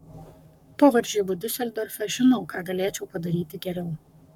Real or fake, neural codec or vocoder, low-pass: fake; codec, 44.1 kHz, 7.8 kbps, Pupu-Codec; 19.8 kHz